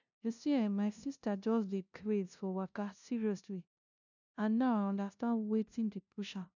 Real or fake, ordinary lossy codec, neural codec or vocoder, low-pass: fake; none; codec, 16 kHz, 0.5 kbps, FunCodec, trained on LibriTTS, 25 frames a second; 7.2 kHz